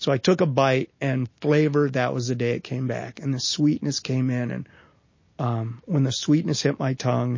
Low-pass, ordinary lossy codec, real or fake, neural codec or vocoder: 7.2 kHz; MP3, 32 kbps; real; none